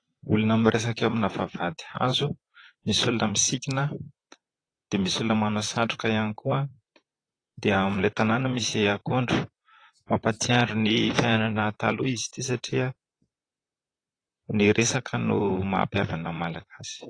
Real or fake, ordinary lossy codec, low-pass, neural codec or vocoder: fake; AAC, 32 kbps; 9.9 kHz; vocoder, 44.1 kHz, 128 mel bands, Pupu-Vocoder